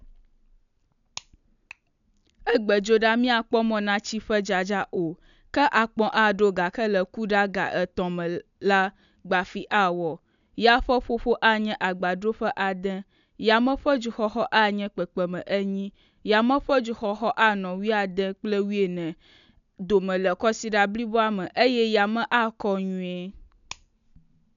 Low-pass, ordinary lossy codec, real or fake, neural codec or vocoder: 7.2 kHz; none; real; none